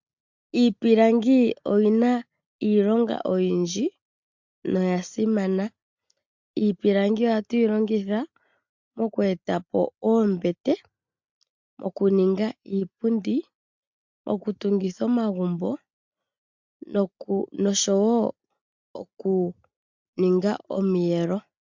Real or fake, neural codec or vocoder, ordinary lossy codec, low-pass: real; none; MP3, 64 kbps; 7.2 kHz